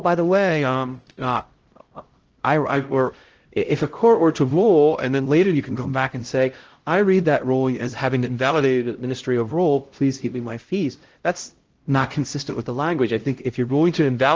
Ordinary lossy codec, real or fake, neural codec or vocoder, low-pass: Opus, 16 kbps; fake; codec, 16 kHz, 0.5 kbps, X-Codec, HuBERT features, trained on LibriSpeech; 7.2 kHz